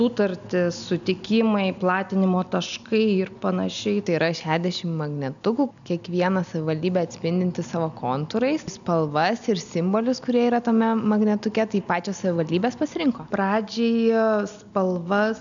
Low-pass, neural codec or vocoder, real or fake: 7.2 kHz; none; real